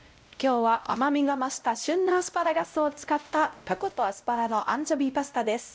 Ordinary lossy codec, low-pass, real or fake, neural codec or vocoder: none; none; fake; codec, 16 kHz, 0.5 kbps, X-Codec, WavLM features, trained on Multilingual LibriSpeech